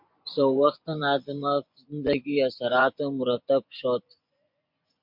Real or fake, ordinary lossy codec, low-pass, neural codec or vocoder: fake; MP3, 48 kbps; 5.4 kHz; vocoder, 24 kHz, 100 mel bands, Vocos